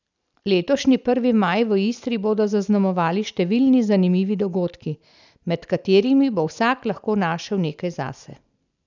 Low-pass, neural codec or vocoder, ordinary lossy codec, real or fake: 7.2 kHz; none; none; real